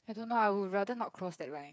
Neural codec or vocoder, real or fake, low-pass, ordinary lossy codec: codec, 16 kHz, 8 kbps, FreqCodec, smaller model; fake; none; none